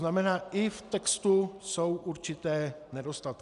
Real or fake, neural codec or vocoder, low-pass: real; none; 10.8 kHz